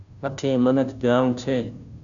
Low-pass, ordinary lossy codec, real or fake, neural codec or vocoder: 7.2 kHz; MP3, 96 kbps; fake; codec, 16 kHz, 0.5 kbps, FunCodec, trained on Chinese and English, 25 frames a second